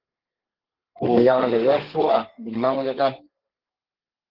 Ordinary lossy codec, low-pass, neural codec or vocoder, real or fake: Opus, 16 kbps; 5.4 kHz; codec, 32 kHz, 1.9 kbps, SNAC; fake